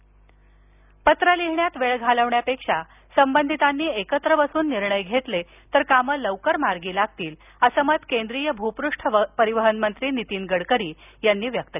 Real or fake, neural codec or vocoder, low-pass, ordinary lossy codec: real; none; 3.6 kHz; none